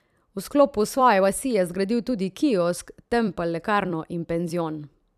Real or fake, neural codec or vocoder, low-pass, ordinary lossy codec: fake; vocoder, 44.1 kHz, 128 mel bands every 256 samples, BigVGAN v2; 14.4 kHz; none